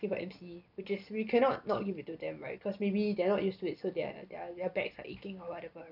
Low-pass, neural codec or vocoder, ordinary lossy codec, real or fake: 5.4 kHz; none; none; real